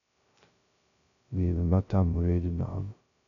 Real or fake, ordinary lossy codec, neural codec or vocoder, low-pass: fake; none; codec, 16 kHz, 0.2 kbps, FocalCodec; 7.2 kHz